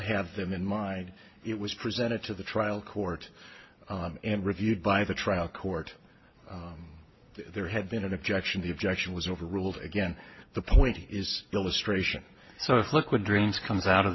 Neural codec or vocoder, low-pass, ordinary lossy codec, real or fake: none; 7.2 kHz; MP3, 24 kbps; real